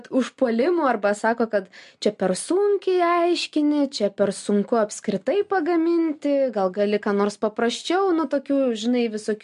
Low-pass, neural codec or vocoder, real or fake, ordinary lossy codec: 10.8 kHz; none; real; MP3, 64 kbps